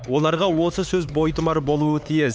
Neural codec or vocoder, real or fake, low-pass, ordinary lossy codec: codec, 16 kHz, 4 kbps, X-Codec, HuBERT features, trained on LibriSpeech; fake; none; none